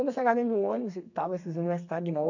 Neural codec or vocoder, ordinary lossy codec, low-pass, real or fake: codec, 32 kHz, 1.9 kbps, SNAC; none; 7.2 kHz; fake